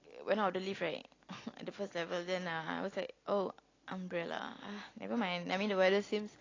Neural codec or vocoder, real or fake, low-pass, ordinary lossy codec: none; real; 7.2 kHz; AAC, 32 kbps